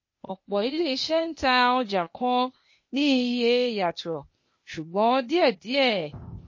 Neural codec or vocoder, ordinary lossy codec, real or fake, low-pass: codec, 16 kHz, 0.8 kbps, ZipCodec; MP3, 32 kbps; fake; 7.2 kHz